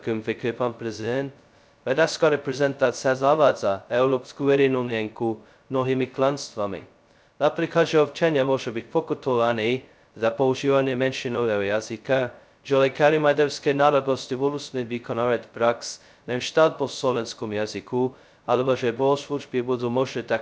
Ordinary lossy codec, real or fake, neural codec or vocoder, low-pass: none; fake; codec, 16 kHz, 0.2 kbps, FocalCodec; none